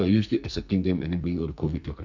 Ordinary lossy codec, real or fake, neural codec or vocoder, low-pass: none; fake; codec, 44.1 kHz, 2.6 kbps, SNAC; 7.2 kHz